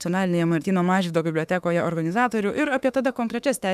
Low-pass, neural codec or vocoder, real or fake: 14.4 kHz; autoencoder, 48 kHz, 32 numbers a frame, DAC-VAE, trained on Japanese speech; fake